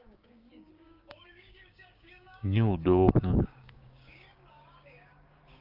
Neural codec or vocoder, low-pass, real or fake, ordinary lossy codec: codec, 16 kHz, 6 kbps, DAC; 5.4 kHz; fake; Opus, 32 kbps